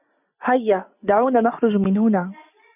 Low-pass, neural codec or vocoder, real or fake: 3.6 kHz; none; real